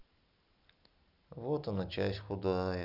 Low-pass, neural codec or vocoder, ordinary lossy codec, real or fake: 5.4 kHz; none; none; real